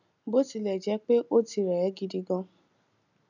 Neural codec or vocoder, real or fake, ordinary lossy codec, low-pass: none; real; none; 7.2 kHz